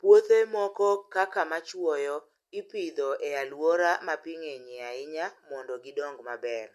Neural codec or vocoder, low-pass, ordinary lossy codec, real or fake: none; 14.4 kHz; MP3, 64 kbps; real